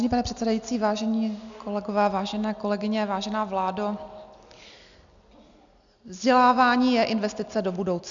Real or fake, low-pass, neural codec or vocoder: real; 7.2 kHz; none